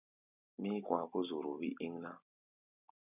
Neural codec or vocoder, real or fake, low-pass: none; real; 3.6 kHz